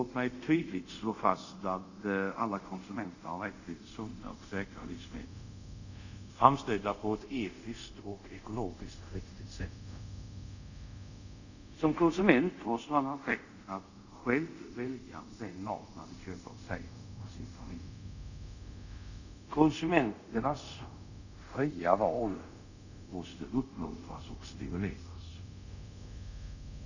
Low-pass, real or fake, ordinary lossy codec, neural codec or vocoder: 7.2 kHz; fake; none; codec, 24 kHz, 0.5 kbps, DualCodec